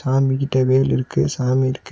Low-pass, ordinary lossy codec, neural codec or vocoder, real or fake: none; none; none; real